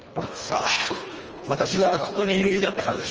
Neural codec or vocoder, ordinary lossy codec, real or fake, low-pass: codec, 24 kHz, 1.5 kbps, HILCodec; Opus, 24 kbps; fake; 7.2 kHz